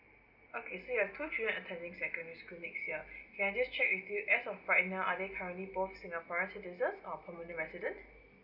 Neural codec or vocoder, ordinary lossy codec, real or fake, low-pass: none; none; real; 5.4 kHz